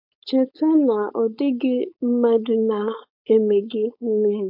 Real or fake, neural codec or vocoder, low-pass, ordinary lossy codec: fake; codec, 16 kHz, 4.8 kbps, FACodec; 5.4 kHz; AAC, 48 kbps